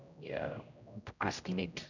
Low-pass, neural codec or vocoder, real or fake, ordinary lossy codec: 7.2 kHz; codec, 16 kHz, 0.5 kbps, X-Codec, HuBERT features, trained on general audio; fake; none